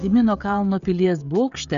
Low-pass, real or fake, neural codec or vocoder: 7.2 kHz; fake; codec, 16 kHz, 16 kbps, FreqCodec, smaller model